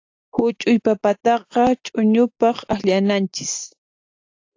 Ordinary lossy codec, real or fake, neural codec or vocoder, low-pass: AAC, 48 kbps; real; none; 7.2 kHz